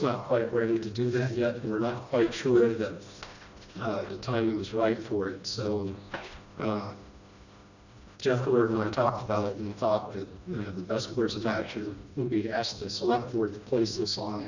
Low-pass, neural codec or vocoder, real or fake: 7.2 kHz; codec, 16 kHz, 1 kbps, FreqCodec, smaller model; fake